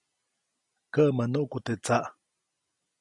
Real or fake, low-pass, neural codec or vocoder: real; 10.8 kHz; none